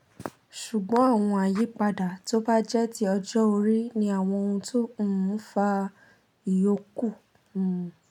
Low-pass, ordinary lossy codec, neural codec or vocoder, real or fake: 19.8 kHz; none; none; real